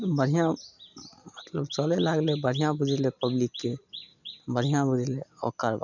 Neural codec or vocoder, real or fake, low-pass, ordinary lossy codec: none; real; 7.2 kHz; none